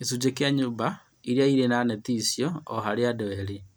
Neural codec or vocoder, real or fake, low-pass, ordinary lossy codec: none; real; none; none